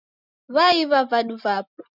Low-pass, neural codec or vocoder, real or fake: 5.4 kHz; none; real